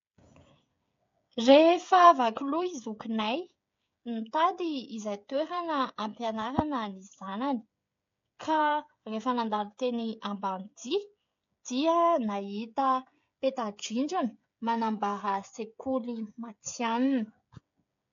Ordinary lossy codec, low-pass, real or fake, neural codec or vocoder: AAC, 48 kbps; 7.2 kHz; fake; codec, 16 kHz, 16 kbps, FreqCodec, smaller model